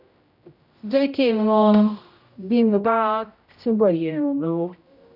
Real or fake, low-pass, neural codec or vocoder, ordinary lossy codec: fake; 5.4 kHz; codec, 16 kHz, 0.5 kbps, X-Codec, HuBERT features, trained on general audio; none